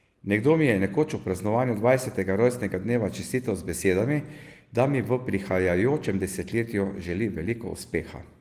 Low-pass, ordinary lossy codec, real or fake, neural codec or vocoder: 14.4 kHz; Opus, 24 kbps; real; none